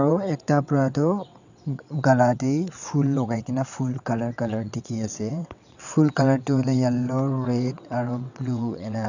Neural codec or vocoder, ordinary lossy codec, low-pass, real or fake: vocoder, 22.05 kHz, 80 mel bands, WaveNeXt; none; 7.2 kHz; fake